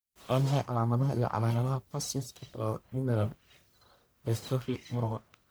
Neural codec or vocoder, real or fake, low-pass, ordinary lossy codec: codec, 44.1 kHz, 1.7 kbps, Pupu-Codec; fake; none; none